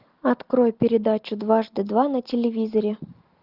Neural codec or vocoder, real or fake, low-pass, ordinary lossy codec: none; real; 5.4 kHz; Opus, 32 kbps